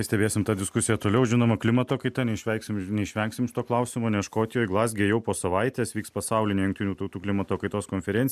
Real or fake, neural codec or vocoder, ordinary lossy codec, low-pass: real; none; MP3, 96 kbps; 14.4 kHz